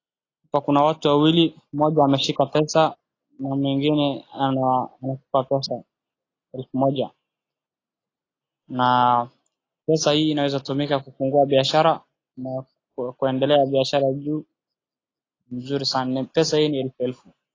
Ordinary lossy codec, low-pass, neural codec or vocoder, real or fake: AAC, 32 kbps; 7.2 kHz; none; real